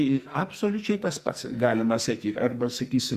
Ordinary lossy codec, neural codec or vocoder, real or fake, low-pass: Opus, 64 kbps; codec, 44.1 kHz, 2.6 kbps, SNAC; fake; 14.4 kHz